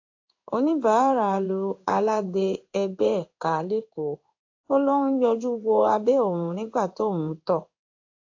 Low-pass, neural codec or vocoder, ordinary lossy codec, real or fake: 7.2 kHz; codec, 16 kHz in and 24 kHz out, 1 kbps, XY-Tokenizer; AAC, 48 kbps; fake